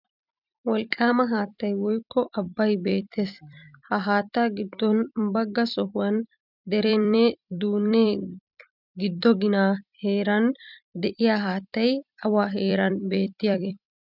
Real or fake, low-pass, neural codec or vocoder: fake; 5.4 kHz; vocoder, 44.1 kHz, 128 mel bands every 256 samples, BigVGAN v2